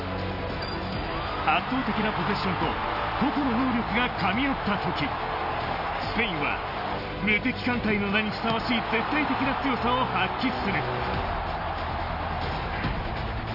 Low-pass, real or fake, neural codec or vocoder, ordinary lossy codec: 5.4 kHz; real; none; none